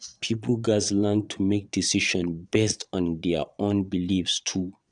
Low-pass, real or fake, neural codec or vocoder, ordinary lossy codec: 9.9 kHz; fake; vocoder, 22.05 kHz, 80 mel bands, Vocos; none